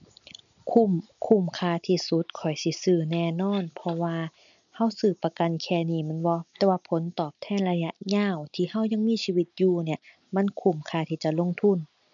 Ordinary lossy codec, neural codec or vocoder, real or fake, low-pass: none; none; real; 7.2 kHz